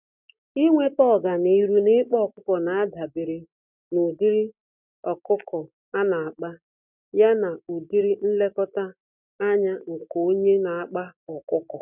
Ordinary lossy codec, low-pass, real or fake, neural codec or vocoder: none; 3.6 kHz; real; none